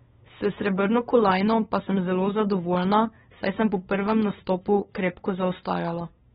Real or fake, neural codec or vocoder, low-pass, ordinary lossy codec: fake; codec, 16 kHz, 8 kbps, FunCodec, trained on LibriTTS, 25 frames a second; 7.2 kHz; AAC, 16 kbps